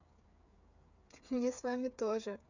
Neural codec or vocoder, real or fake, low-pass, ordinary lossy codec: codec, 16 kHz, 8 kbps, FreqCodec, smaller model; fake; 7.2 kHz; none